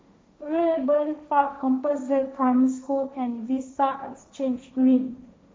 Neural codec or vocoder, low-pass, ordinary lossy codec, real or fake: codec, 16 kHz, 1.1 kbps, Voila-Tokenizer; none; none; fake